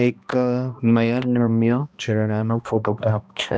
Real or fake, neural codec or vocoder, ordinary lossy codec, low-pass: fake; codec, 16 kHz, 1 kbps, X-Codec, HuBERT features, trained on balanced general audio; none; none